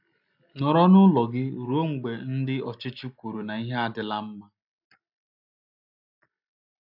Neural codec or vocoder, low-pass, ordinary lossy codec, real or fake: none; 5.4 kHz; AAC, 48 kbps; real